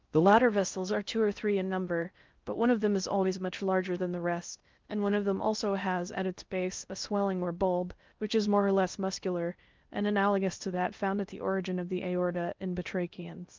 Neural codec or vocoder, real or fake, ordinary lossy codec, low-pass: codec, 16 kHz in and 24 kHz out, 0.6 kbps, FocalCodec, streaming, 4096 codes; fake; Opus, 32 kbps; 7.2 kHz